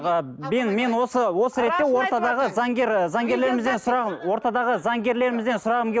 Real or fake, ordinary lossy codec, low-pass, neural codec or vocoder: real; none; none; none